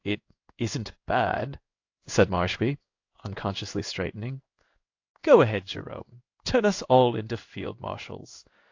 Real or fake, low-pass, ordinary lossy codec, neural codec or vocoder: fake; 7.2 kHz; AAC, 48 kbps; codec, 16 kHz in and 24 kHz out, 1 kbps, XY-Tokenizer